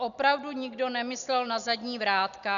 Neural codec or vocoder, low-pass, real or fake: none; 7.2 kHz; real